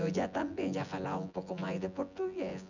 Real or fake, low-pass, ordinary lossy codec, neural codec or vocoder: fake; 7.2 kHz; none; vocoder, 24 kHz, 100 mel bands, Vocos